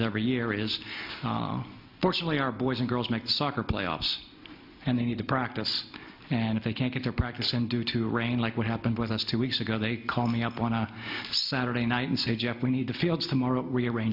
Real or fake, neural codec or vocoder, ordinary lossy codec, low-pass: real; none; MP3, 48 kbps; 5.4 kHz